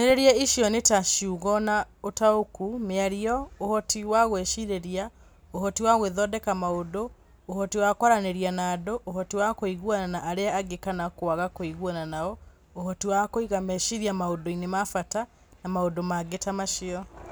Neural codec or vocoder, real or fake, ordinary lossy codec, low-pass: none; real; none; none